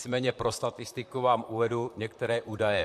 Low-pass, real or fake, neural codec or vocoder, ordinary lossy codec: 14.4 kHz; fake; vocoder, 44.1 kHz, 128 mel bands, Pupu-Vocoder; MP3, 64 kbps